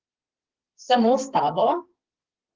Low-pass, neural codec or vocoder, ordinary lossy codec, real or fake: 7.2 kHz; codec, 32 kHz, 1.9 kbps, SNAC; Opus, 16 kbps; fake